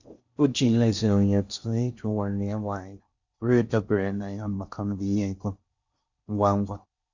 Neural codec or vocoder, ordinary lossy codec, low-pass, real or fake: codec, 16 kHz in and 24 kHz out, 0.6 kbps, FocalCodec, streaming, 4096 codes; Opus, 64 kbps; 7.2 kHz; fake